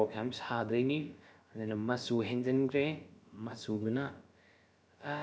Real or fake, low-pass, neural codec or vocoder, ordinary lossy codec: fake; none; codec, 16 kHz, about 1 kbps, DyCAST, with the encoder's durations; none